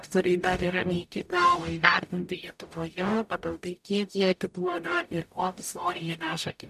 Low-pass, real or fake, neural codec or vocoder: 14.4 kHz; fake; codec, 44.1 kHz, 0.9 kbps, DAC